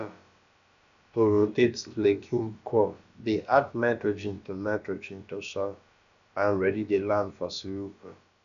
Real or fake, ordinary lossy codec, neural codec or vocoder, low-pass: fake; AAC, 96 kbps; codec, 16 kHz, about 1 kbps, DyCAST, with the encoder's durations; 7.2 kHz